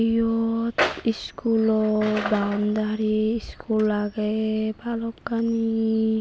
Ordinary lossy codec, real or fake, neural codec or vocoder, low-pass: none; real; none; none